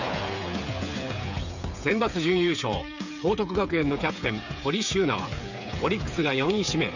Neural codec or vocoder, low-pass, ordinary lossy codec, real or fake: codec, 16 kHz, 8 kbps, FreqCodec, smaller model; 7.2 kHz; none; fake